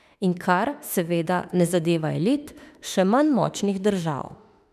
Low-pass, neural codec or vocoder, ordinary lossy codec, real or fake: 14.4 kHz; autoencoder, 48 kHz, 32 numbers a frame, DAC-VAE, trained on Japanese speech; none; fake